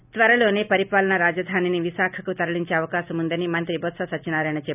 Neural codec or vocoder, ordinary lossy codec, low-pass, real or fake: none; none; 3.6 kHz; real